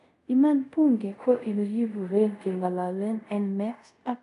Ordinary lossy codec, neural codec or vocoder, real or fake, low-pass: none; codec, 24 kHz, 0.5 kbps, DualCodec; fake; 10.8 kHz